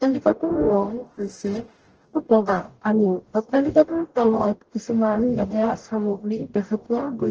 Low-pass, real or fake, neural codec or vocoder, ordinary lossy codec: 7.2 kHz; fake; codec, 44.1 kHz, 0.9 kbps, DAC; Opus, 16 kbps